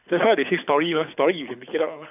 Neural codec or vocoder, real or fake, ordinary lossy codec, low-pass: codec, 24 kHz, 6 kbps, HILCodec; fake; none; 3.6 kHz